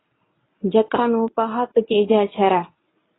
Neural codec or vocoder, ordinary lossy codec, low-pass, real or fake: codec, 24 kHz, 0.9 kbps, WavTokenizer, medium speech release version 1; AAC, 16 kbps; 7.2 kHz; fake